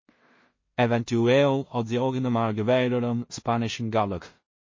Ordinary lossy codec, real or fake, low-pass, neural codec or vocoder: MP3, 32 kbps; fake; 7.2 kHz; codec, 16 kHz in and 24 kHz out, 0.4 kbps, LongCat-Audio-Codec, two codebook decoder